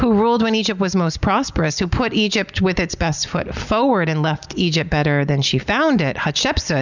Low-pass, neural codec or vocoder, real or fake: 7.2 kHz; none; real